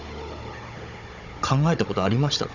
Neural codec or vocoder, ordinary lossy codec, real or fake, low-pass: codec, 16 kHz, 16 kbps, FunCodec, trained on Chinese and English, 50 frames a second; none; fake; 7.2 kHz